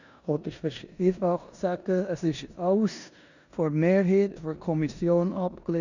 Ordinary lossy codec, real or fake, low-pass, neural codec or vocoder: none; fake; 7.2 kHz; codec, 16 kHz in and 24 kHz out, 0.9 kbps, LongCat-Audio-Codec, four codebook decoder